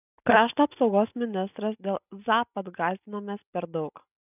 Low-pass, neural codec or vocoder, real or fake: 3.6 kHz; none; real